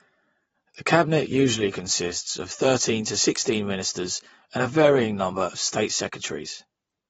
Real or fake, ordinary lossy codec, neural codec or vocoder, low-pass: fake; AAC, 24 kbps; vocoder, 44.1 kHz, 128 mel bands every 512 samples, BigVGAN v2; 19.8 kHz